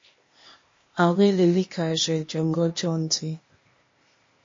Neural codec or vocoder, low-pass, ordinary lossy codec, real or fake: codec, 16 kHz, 0.8 kbps, ZipCodec; 7.2 kHz; MP3, 32 kbps; fake